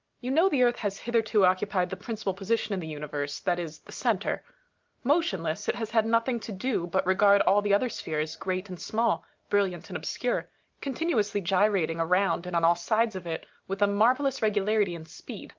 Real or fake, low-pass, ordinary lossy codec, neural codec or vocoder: real; 7.2 kHz; Opus, 24 kbps; none